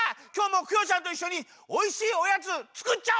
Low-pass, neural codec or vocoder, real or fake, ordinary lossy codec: none; none; real; none